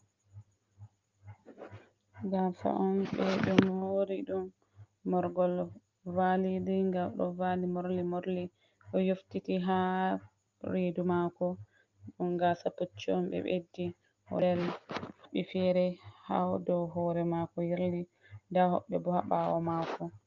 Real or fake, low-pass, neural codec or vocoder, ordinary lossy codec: real; 7.2 kHz; none; Opus, 32 kbps